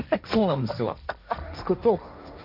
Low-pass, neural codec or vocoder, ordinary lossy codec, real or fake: 5.4 kHz; codec, 16 kHz, 1.1 kbps, Voila-Tokenizer; none; fake